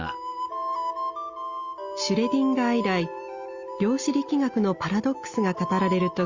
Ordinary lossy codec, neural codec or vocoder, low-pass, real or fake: Opus, 32 kbps; none; 7.2 kHz; real